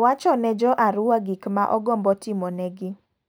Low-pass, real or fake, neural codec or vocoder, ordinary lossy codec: none; real; none; none